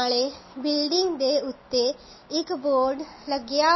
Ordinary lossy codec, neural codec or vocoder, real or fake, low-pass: MP3, 24 kbps; none; real; 7.2 kHz